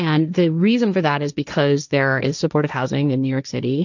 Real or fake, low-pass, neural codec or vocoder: fake; 7.2 kHz; codec, 16 kHz, 1.1 kbps, Voila-Tokenizer